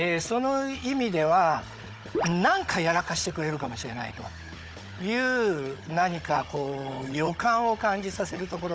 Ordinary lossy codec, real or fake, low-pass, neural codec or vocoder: none; fake; none; codec, 16 kHz, 16 kbps, FunCodec, trained on Chinese and English, 50 frames a second